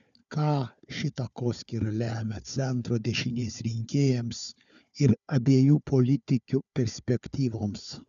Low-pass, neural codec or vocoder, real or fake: 7.2 kHz; codec, 16 kHz, 4 kbps, FunCodec, trained on Chinese and English, 50 frames a second; fake